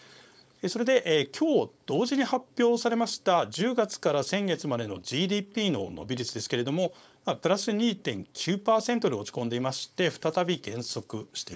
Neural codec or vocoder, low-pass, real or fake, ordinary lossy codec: codec, 16 kHz, 4.8 kbps, FACodec; none; fake; none